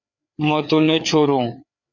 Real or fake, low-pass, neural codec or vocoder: fake; 7.2 kHz; codec, 16 kHz, 4 kbps, FreqCodec, larger model